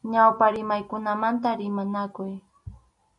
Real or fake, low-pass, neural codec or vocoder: real; 10.8 kHz; none